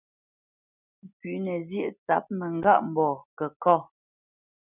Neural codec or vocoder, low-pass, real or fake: none; 3.6 kHz; real